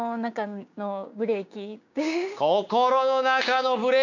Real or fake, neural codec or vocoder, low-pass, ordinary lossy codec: fake; codec, 16 kHz, 6 kbps, DAC; 7.2 kHz; none